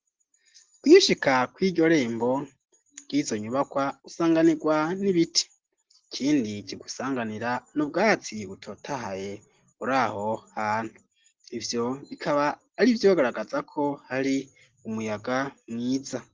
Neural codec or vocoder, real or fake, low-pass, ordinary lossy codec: none; real; 7.2 kHz; Opus, 16 kbps